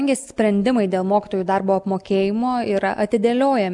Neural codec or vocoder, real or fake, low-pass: none; real; 10.8 kHz